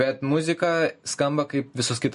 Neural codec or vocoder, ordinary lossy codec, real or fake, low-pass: none; MP3, 48 kbps; real; 14.4 kHz